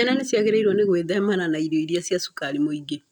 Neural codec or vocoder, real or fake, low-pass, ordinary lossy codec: none; real; 19.8 kHz; none